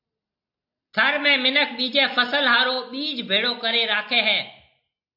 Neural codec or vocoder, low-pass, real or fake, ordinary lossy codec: none; 5.4 kHz; real; AAC, 48 kbps